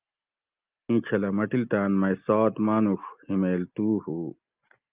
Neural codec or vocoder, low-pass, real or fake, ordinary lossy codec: none; 3.6 kHz; real; Opus, 32 kbps